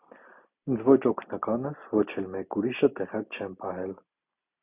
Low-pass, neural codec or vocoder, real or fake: 3.6 kHz; none; real